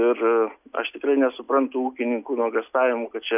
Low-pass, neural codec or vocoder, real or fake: 3.6 kHz; none; real